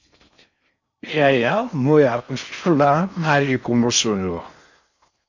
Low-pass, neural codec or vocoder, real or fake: 7.2 kHz; codec, 16 kHz in and 24 kHz out, 0.6 kbps, FocalCodec, streaming, 4096 codes; fake